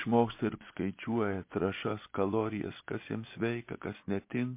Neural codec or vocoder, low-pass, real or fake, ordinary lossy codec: vocoder, 24 kHz, 100 mel bands, Vocos; 3.6 kHz; fake; MP3, 24 kbps